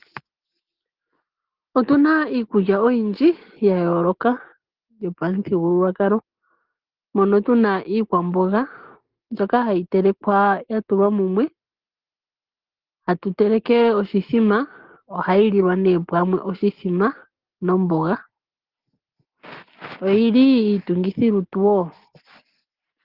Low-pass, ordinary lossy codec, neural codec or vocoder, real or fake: 5.4 kHz; Opus, 16 kbps; none; real